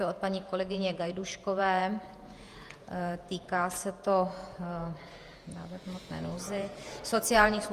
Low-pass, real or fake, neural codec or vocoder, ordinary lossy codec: 14.4 kHz; fake; vocoder, 48 kHz, 128 mel bands, Vocos; Opus, 32 kbps